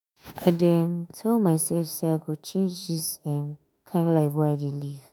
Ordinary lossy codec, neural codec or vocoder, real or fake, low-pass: none; autoencoder, 48 kHz, 32 numbers a frame, DAC-VAE, trained on Japanese speech; fake; none